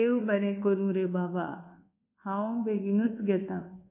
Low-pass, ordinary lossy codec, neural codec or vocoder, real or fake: 3.6 kHz; MP3, 24 kbps; codec, 24 kHz, 1.2 kbps, DualCodec; fake